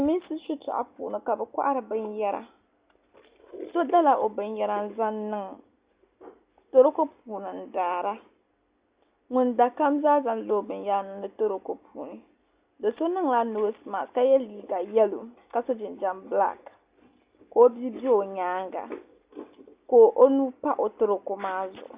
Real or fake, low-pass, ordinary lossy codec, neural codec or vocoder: real; 3.6 kHz; Opus, 64 kbps; none